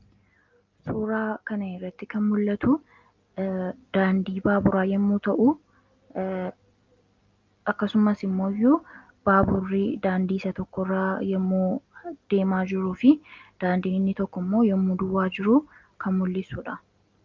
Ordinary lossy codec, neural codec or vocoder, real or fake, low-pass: Opus, 24 kbps; none; real; 7.2 kHz